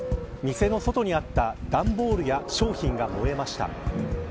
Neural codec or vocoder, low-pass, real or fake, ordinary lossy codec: none; none; real; none